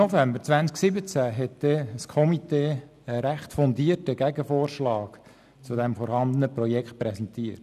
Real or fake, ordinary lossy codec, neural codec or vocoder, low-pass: real; none; none; 14.4 kHz